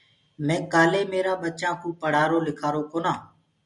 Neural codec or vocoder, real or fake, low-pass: none; real; 10.8 kHz